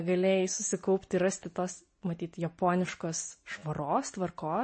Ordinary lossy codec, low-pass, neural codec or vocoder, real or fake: MP3, 32 kbps; 9.9 kHz; none; real